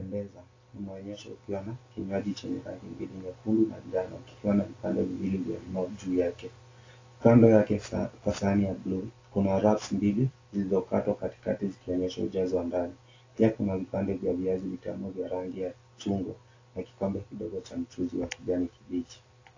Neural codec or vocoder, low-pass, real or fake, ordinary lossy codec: none; 7.2 kHz; real; AAC, 32 kbps